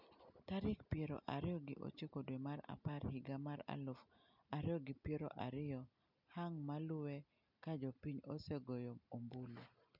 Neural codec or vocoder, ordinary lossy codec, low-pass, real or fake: none; none; 5.4 kHz; real